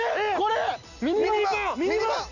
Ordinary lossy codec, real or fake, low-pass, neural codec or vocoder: none; real; 7.2 kHz; none